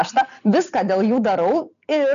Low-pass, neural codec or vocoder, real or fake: 7.2 kHz; none; real